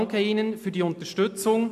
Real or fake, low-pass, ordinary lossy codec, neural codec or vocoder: real; 14.4 kHz; AAC, 96 kbps; none